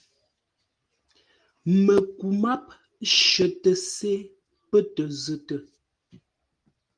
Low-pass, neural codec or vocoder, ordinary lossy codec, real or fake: 9.9 kHz; none; Opus, 24 kbps; real